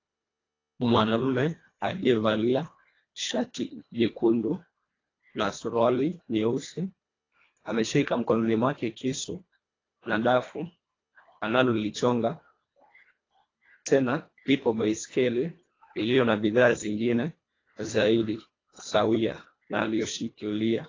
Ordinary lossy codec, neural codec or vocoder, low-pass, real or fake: AAC, 32 kbps; codec, 24 kHz, 1.5 kbps, HILCodec; 7.2 kHz; fake